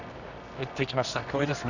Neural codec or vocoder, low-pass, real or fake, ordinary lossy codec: codec, 24 kHz, 0.9 kbps, WavTokenizer, medium music audio release; 7.2 kHz; fake; none